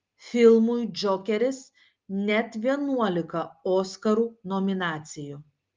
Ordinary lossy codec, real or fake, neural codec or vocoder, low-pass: Opus, 24 kbps; real; none; 7.2 kHz